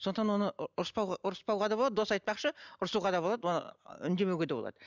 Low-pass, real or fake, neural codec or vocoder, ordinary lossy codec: 7.2 kHz; real; none; none